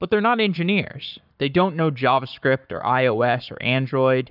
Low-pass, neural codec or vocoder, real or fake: 5.4 kHz; codec, 44.1 kHz, 7.8 kbps, Pupu-Codec; fake